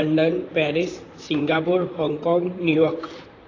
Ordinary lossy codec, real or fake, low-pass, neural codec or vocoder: AAC, 32 kbps; fake; 7.2 kHz; vocoder, 44.1 kHz, 128 mel bands, Pupu-Vocoder